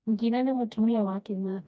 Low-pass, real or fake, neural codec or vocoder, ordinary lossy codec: none; fake; codec, 16 kHz, 1 kbps, FreqCodec, smaller model; none